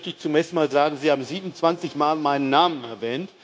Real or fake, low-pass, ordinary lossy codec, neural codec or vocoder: fake; none; none; codec, 16 kHz, 0.9 kbps, LongCat-Audio-Codec